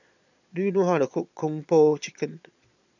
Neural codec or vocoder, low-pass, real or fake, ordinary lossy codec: vocoder, 22.05 kHz, 80 mel bands, Vocos; 7.2 kHz; fake; none